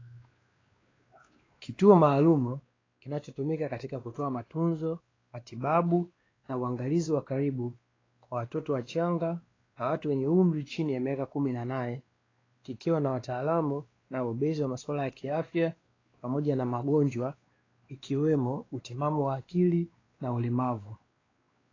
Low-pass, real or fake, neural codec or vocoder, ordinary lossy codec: 7.2 kHz; fake; codec, 16 kHz, 2 kbps, X-Codec, WavLM features, trained on Multilingual LibriSpeech; AAC, 32 kbps